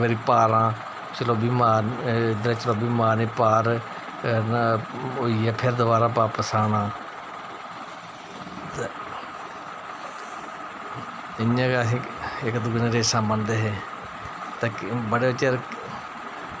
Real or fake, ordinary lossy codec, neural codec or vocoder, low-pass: real; none; none; none